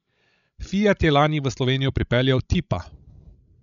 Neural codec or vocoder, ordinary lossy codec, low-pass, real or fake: codec, 16 kHz, 16 kbps, FreqCodec, larger model; none; 7.2 kHz; fake